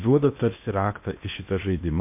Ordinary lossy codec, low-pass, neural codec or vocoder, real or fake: MP3, 32 kbps; 3.6 kHz; codec, 16 kHz in and 24 kHz out, 0.8 kbps, FocalCodec, streaming, 65536 codes; fake